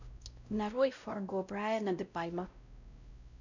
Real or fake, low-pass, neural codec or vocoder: fake; 7.2 kHz; codec, 16 kHz, 0.5 kbps, X-Codec, WavLM features, trained on Multilingual LibriSpeech